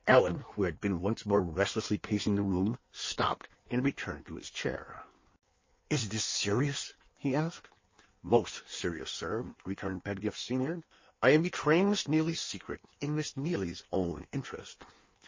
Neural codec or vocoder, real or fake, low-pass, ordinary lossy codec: codec, 16 kHz in and 24 kHz out, 1.1 kbps, FireRedTTS-2 codec; fake; 7.2 kHz; MP3, 32 kbps